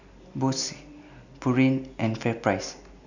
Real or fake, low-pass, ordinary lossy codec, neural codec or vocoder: real; 7.2 kHz; none; none